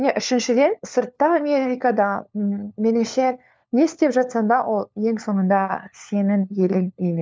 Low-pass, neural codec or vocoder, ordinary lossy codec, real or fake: none; codec, 16 kHz, 4 kbps, FunCodec, trained on LibriTTS, 50 frames a second; none; fake